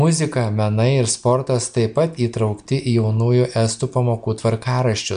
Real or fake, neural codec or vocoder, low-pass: real; none; 9.9 kHz